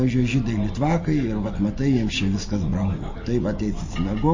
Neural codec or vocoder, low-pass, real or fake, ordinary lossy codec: none; 7.2 kHz; real; MP3, 32 kbps